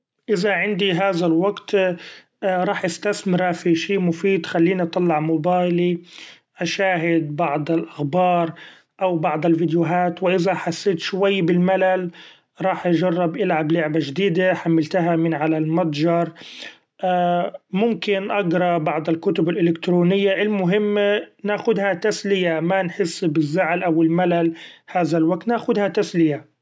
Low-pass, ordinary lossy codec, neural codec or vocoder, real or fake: none; none; none; real